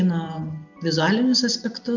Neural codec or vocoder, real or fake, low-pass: none; real; 7.2 kHz